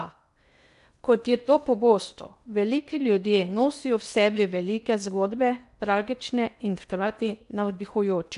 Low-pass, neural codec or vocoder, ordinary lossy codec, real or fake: 10.8 kHz; codec, 16 kHz in and 24 kHz out, 0.8 kbps, FocalCodec, streaming, 65536 codes; none; fake